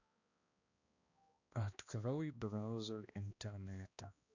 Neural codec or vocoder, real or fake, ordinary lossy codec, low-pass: codec, 16 kHz, 1 kbps, X-Codec, HuBERT features, trained on balanced general audio; fake; none; 7.2 kHz